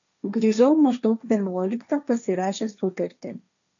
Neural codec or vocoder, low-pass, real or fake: codec, 16 kHz, 1.1 kbps, Voila-Tokenizer; 7.2 kHz; fake